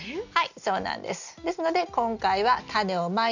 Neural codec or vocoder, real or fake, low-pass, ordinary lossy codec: none; real; 7.2 kHz; none